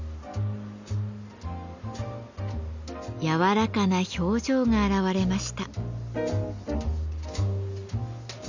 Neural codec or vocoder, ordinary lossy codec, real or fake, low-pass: none; Opus, 64 kbps; real; 7.2 kHz